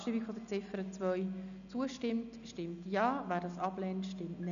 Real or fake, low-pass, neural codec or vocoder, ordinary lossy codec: real; 7.2 kHz; none; none